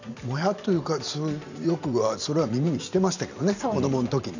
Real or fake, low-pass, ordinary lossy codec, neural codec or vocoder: fake; 7.2 kHz; none; vocoder, 44.1 kHz, 128 mel bands every 512 samples, BigVGAN v2